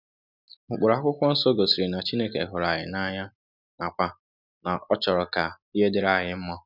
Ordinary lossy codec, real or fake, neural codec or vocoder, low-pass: none; real; none; 5.4 kHz